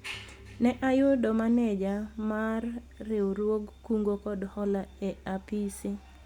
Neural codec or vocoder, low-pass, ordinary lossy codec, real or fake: none; 19.8 kHz; Opus, 64 kbps; real